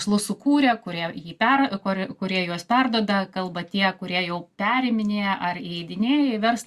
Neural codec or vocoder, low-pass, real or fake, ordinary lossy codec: none; 14.4 kHz; real; Opus, 64 kbps